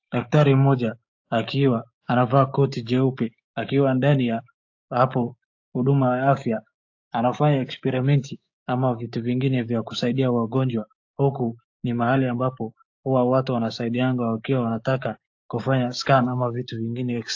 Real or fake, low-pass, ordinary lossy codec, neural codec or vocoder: fake; 7.2 kHz; AAC, 48 kbps; codec, 16 kHz, 6 kbps, DAC